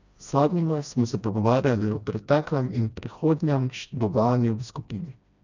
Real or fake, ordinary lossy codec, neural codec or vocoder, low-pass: fake; none; codec, 16 kHz, 1 kbps, FreqCodec, smaller model; 7.2 kHz